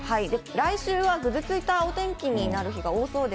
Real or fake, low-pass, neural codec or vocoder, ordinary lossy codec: real; none; none; none